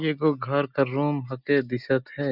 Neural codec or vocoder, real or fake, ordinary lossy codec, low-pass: none; real; none; 5.4 kHz